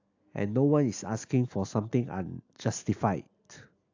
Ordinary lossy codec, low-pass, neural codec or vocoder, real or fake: AAC, 48 kbps; 7.2 kHz; none; real